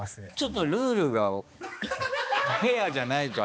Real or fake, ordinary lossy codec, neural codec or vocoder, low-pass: fake; none; codec, 16 kHz, 2 kbps, X-Codec, HuBERT features, trained on balanced general audio; none